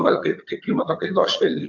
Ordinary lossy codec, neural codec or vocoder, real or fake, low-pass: MP3, 48 kbps; vocoder, 22.05 kHz, 80 mel bands, HiFi-GAN; fake; 7.2 kHz